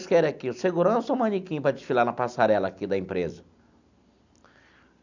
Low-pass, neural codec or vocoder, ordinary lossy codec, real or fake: 7.2 kHz; none; none; real